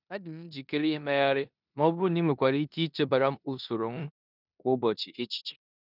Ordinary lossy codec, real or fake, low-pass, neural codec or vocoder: none; fake; 5.4 kHz; codec, 16 kHz in and 24 kHz out, 0.9 kbps, LongCat-Audio-Codec, four codebook decoder